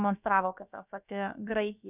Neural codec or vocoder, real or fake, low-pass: codec, 16 kHz, about 1 kbps, DyCAST, with the encoder's durations; fake; 3.6 kHz